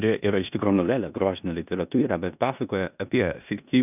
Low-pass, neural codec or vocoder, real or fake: 3.6 kHz; codec, 16 kHz in and 24 kHz out, 0.9 kbps, LongCat-Audio-Codec, four codebook decoder; fake